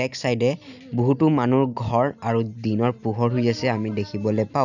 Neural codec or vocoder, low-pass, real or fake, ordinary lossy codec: none; 7.2 kHz; real; none